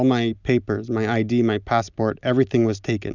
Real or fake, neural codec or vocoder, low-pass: real; none; 7.2 kHz